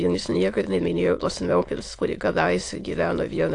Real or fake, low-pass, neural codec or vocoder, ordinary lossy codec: fake; 9.9 kHz; autoencoder, 22.05 kHz, a latent of 192 numbers a frame, VITS, trained on many speakers; AAC, 48 kbps